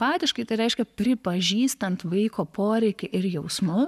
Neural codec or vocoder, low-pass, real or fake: codec, 44.1 kHz, 7.8 kbps, Pupu-Codec; 14.4 kHz; fake